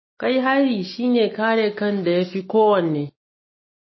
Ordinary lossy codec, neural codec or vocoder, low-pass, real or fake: MP3, 24 kbps; none; 7.2 kHz; real